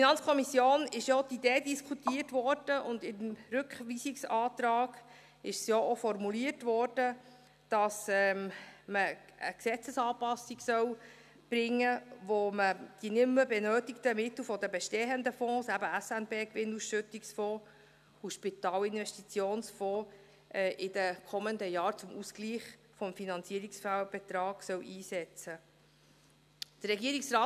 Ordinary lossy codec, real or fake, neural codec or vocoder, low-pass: none; real; none; 14.4 kHz